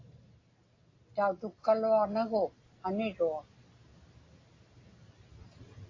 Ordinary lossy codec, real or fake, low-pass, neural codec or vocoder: AAC, 32 kbps; real; 7.2 kHz; none